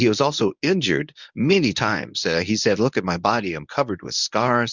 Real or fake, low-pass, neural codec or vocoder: fake; 7.2 kHz; codec, 24 kHz, 0.9 kbps, WavTokenizer, medium speech release version 1